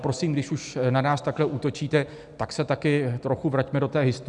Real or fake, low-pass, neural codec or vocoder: real; 10.8 kHz; none